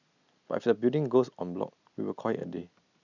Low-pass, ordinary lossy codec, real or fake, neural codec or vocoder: 7.2 kHz; none; real; none